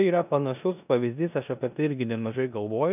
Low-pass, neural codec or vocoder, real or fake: 3.6 kHz; codec, 16 kHz in and 24 kHz out, 0.9 kbps, LongCat-Audio-Codec, four codebook decoder; fake